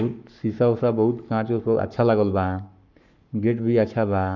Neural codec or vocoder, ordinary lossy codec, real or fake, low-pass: codec, 44.1 kHz, 7.8 kbps, Pupu-Codec; none; fake; 7.2 kHz